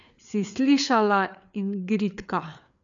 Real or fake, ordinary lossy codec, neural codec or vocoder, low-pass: fake; none; codec, 16 kHz, 4 kbps, FreqCodec, larger model; 7.2 kHz